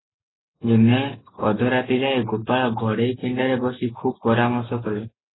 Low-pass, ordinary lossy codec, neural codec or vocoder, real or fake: 7.2 kHz; AAC, 16 kbps; none; real